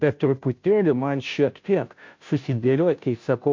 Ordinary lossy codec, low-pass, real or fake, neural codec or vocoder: MP3, 48 kbps; 7.2 kHz; fake; codec, 16 kHz, 0.5 kbps, FunCodec, trained on Chinese and English, 25 frames a second